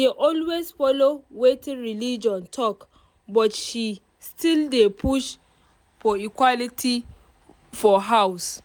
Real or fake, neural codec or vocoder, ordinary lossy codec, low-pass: real; none; none; none